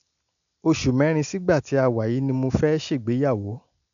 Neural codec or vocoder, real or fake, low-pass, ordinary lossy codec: none; real; 7.2 kHz; none